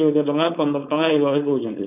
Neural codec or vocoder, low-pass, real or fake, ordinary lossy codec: codec, 16 kHz, 4.8 kbps, FACodec; 3.6 kHz; fake; none